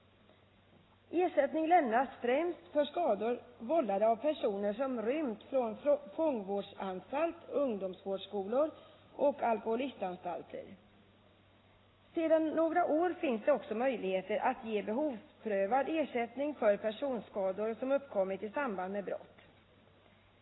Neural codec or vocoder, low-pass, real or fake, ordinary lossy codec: none; 7.2 kHz; real; AAC, 16 kbps